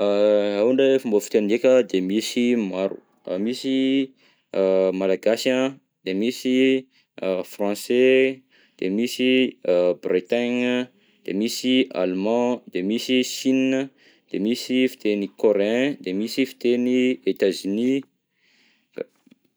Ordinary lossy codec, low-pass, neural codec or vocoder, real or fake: none; none; none; real